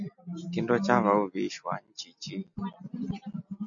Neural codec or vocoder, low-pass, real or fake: none; 7.2 kHz; real